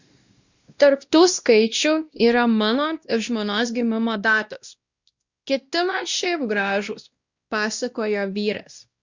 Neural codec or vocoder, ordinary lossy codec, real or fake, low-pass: codec, 16 kHz, 1 kbps, X-Codec, WavLM features, trained on Multilingual LibriSpeech; Opus, 64 kbps; fake; 7.2 kHz